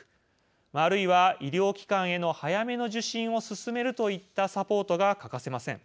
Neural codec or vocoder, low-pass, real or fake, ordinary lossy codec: none; none; real; none